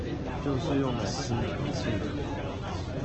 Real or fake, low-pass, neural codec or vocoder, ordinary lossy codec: real; 7.2 kHz; none; Opus, 16 kbps